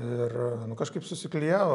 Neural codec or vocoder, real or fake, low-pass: none; real; 10.8 kHz